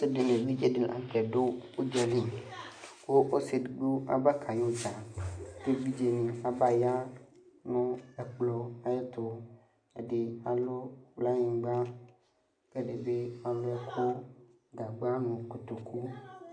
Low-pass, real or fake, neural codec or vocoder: 9.9 kHz; real; none